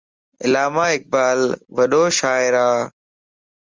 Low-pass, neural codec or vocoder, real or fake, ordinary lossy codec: 7.2 kHz; none; real; Opus, 32 kbps